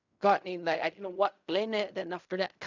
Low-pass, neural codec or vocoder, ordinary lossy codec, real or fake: 7.2 kHz; codec, 16 kHz in and 24 kHz out, 0.4 kbps, LongCat-Audio-Codec, fine tuned four codebook decoder; none; fake